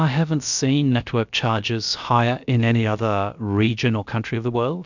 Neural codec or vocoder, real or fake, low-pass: codec, 16 kHz, about 1 kbps, DyCAST, with the encoder's durations; fake; 7.2 kHz